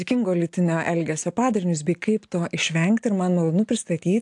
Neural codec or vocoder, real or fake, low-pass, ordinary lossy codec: none; real; 10.8 kHz; AAC, 64 kbps